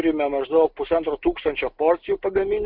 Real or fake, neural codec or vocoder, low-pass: real; none; 5.4 kHz